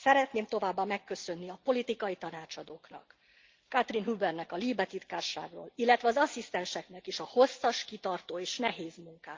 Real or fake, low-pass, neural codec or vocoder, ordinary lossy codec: real; 7.2 kHz; none; Opus, 24 kbps